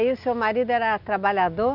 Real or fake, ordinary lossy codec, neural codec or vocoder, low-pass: real; none; none; 5.4 kHz